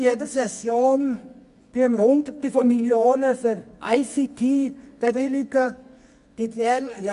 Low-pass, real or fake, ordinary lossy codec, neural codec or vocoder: 10.8 kHz; fake; MP3, 96 kbps; codec, 24 kHz, 0.9 kbps, WavTokenizer, medium music audio release